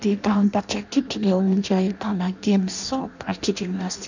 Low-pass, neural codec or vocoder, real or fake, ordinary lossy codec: 7.2 kHz; codec, 16 kHz in and 24 kHz out, 0.6 kbps, FireRedTTS-2 codec; fake; none